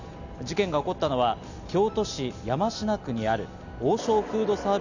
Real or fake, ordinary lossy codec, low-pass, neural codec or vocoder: real; none; 7.2 kHz; none